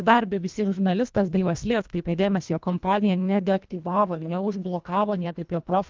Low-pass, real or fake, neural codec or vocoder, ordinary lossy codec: 7.2 kHz; fake; codec, 24 kHz, 1.5 kbps, HILCodec; Opus, 32 kbps